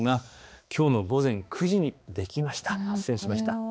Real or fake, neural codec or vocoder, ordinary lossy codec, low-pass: fake; codec, 16 kHz, 2 kbps, X-Codec, HuBERT features, trained on balanced general audio; none; none